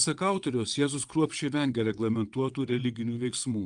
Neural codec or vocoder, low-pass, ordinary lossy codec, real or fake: vocoder, 22.05 kHz, 80 mel bands, WaveNeXt; 9.9 kHz; Opus, 32 kbps; fake